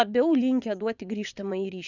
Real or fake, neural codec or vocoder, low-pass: fake; vocoder, 22.05 kHz, 80 mel bands, WaveNeXt; 7.2 kHz